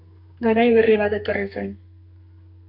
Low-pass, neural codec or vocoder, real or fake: 5.4 kHz; codec, 32 kHz, 1.9 kbps, SNAC; fake